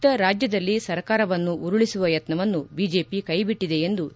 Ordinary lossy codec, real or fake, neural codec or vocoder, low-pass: none; real; none; none